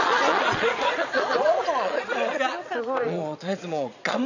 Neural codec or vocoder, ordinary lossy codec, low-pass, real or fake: vocoder, 22.05 kHz, 80 mel bands, Vocos; none; 7.2 kHz; fake